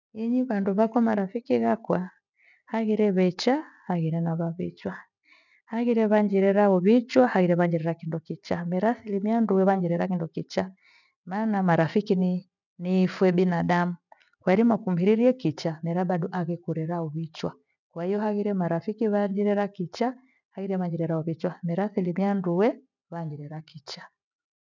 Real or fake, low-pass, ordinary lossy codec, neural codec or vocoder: real; 7.2 kHz; none; none